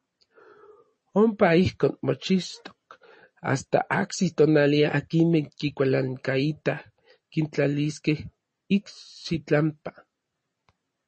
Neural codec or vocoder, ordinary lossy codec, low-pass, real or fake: none; MP3, 32 kbps; 10.8 kHz; real